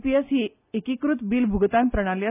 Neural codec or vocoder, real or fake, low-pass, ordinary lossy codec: none; real; 3.6 kHz; none